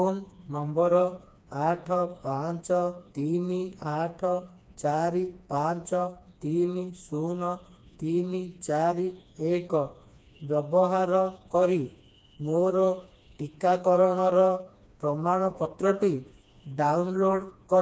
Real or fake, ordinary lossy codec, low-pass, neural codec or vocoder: fake; none; none; codec, 16 kHz, 2 kbps, FreqCodec, smaller model